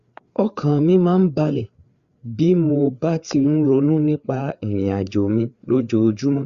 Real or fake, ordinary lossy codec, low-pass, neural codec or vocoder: fake; Opus, 64 kbps; 7.2 kHz; codec, 16 kHz, 4 kbps, FreqCodec, larger model